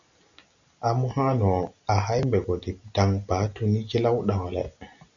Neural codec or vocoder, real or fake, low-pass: none; real; 7.2 kHz